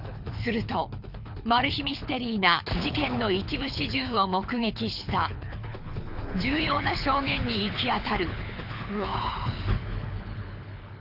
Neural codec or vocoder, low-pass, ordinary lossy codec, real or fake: codec, 24 kHz, 6 kbps, HILCodec; 5.4 kHz; none; fake